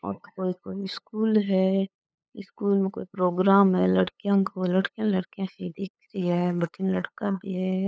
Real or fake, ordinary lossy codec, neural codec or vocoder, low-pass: fake; none; codec, 16 kHz, 8 kbps, FunCodec, trained on LibriTTS, 25 frames a second; none